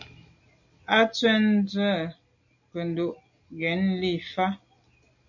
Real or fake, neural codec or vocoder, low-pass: real; none; 7.2 kHz